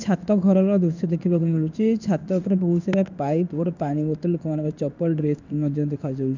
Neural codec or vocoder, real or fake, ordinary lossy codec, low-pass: codec, 16 kHz in and 24 kHz out, 1 kbps, XY-Tokenizer; fake; none; 7.2 kHz